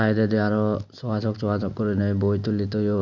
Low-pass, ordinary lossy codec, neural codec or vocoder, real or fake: 7.2 kHz; none; none; real